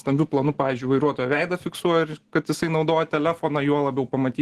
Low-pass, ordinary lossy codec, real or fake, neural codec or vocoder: 14.4 kHz; Opus, 16 kbps; real; none